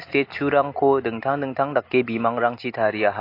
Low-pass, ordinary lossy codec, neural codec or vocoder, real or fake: 5.4 kHz; AAC, 32 kbps; none; real